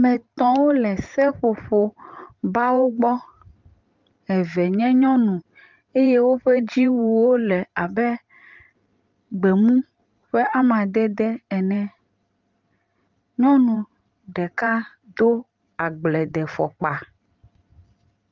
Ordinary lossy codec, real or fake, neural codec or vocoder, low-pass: Opus, 32 kbps; fake; vocoder, 44.1 kHz, 128 mel bands every 512 samples, BigVGAN v2; 7.2 kHz